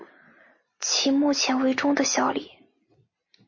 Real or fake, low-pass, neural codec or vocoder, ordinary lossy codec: real; 7.2 kHz; none; MP3, 32 kbps